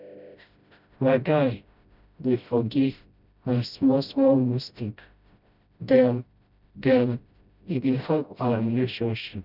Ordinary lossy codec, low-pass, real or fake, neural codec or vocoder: none; 5.4 kHz; fake; codec, 16 kHz, 0.5 kbps, FreqCodec, smaller model